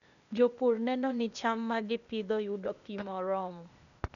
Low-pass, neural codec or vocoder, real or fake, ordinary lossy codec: 7.2 kHz; codec, 16 kHz, 0.8 kbps, ZipCodec; fake; none